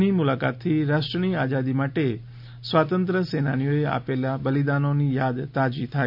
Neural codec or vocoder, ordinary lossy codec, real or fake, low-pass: none; none; real; 5.4 kHz